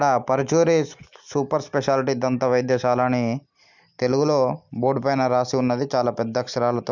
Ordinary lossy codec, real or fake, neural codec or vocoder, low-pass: none; real; none; 7.2 kHz